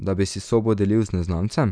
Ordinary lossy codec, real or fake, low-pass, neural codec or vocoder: none; real; 9.9 kHz; none